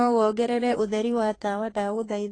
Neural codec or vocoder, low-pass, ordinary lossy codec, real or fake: codec, 32 kHz, 1.9 kbps, SNAC; 9.9 kHz; AAC, 32 kbps; fake